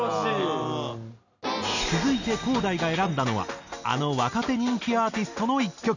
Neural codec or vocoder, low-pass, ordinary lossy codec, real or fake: none; 7.2 kHz; none; real